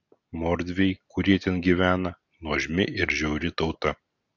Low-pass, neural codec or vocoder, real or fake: 7.2 kHz; none; real